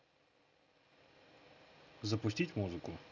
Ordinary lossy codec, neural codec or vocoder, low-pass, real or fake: none; none; 7.2 kHz; real